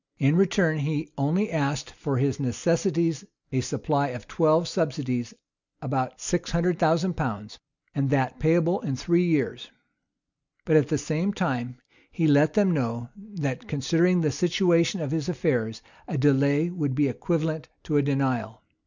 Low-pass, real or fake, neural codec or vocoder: 7.2 kHz; real; none